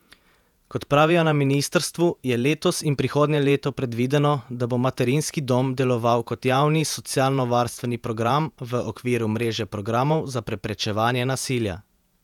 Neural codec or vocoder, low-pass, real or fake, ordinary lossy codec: vocoder, 48 kHz, 128 mel bands, Vocos; 19.8 kHz; fake; none